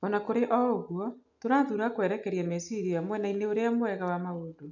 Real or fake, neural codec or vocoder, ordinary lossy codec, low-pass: real; none; none; 7.2 kHz